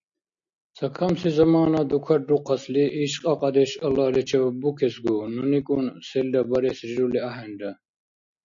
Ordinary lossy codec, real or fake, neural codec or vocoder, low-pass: MP3, 48 kbps; real; none; 7.2 kHz